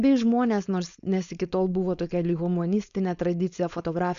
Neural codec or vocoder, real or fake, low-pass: codec, 16 kHz, 4.8 kbps, FACodec; fake; 7.2 kHz